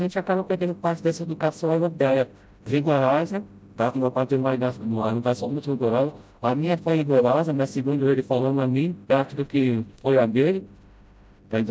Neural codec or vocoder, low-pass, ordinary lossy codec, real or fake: codec, 16 kHz, 0.5 kbps, FreqCodec, smaller model; none; none; fake